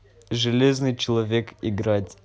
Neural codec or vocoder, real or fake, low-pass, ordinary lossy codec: none; real; none; none